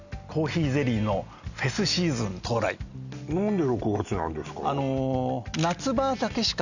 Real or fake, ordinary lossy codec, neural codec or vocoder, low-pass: real; none; none; 7.2 kHz